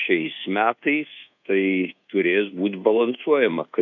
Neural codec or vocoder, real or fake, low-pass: codec, 24 kHz, 1.2 kbps, DualCodec; fake; 7.2 kHz